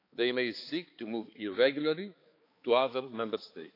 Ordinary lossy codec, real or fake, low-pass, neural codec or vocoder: AAC, 32 kbps; fake; 5.4 kHz; codec, 16 kHz, 4 kbps, X-Codec, HuBERT features, trained on balanced general audio